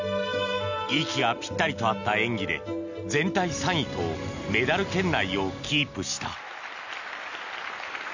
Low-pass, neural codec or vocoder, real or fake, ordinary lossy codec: 7.2 kHz; none; real; none